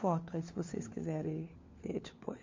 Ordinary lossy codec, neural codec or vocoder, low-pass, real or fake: MP3, 48 kbps; codec, 16 kHz, 4 kbps, FunCodec, trained on LibriTTS, 50 frames a second; 7.2 kHz; fake